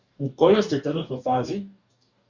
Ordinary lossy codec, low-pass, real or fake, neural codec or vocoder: Opus, 64 kbps; 7.2 kHz; fake; codec, 44.1 kHz, 2.6 kbps, DAC